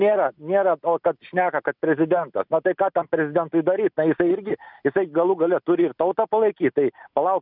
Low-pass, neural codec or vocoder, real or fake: 5.4 kHz; none; real